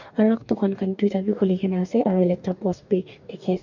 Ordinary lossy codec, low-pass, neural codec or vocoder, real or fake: none; 7.2 kHz; codec, 44.1 kHz, 2.6 kbps, DAC; fake